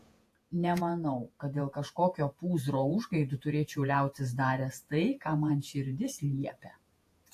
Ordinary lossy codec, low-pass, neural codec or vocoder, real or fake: AAC, 64 kbps; 14.4 kHz; vocoder, 48 kHz, 128 mel bands, Vocos; fake